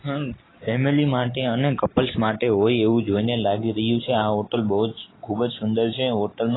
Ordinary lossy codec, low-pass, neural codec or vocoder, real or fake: AAC, 16 kbps; 7.2 kHz; none; real